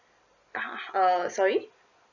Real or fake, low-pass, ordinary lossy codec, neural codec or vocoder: real; 7.2 kHz; none; none